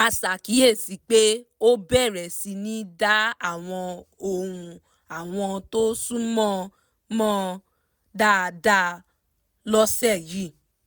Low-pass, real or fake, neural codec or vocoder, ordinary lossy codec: none; real; none; none